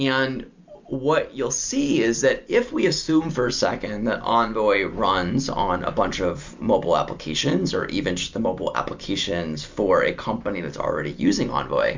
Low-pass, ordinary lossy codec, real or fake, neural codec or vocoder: 7.2 kHz; MP3, 64 kbps; real; none